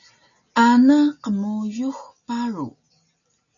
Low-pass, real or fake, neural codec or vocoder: 7.2 kHz; real; none